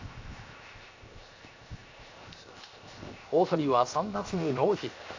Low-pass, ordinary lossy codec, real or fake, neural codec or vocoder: 7.2 kHz; AAC, 48 kbps; fake; codec, 16 kHz, 0.7 kbps, FocalCodec